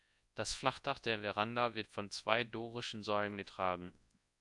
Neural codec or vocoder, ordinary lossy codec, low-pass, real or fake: codec, 24 kHz, 0.9 kbps, WavTokenizer, large speech release; AAC, 64 kbps; 10.8 kHz; fake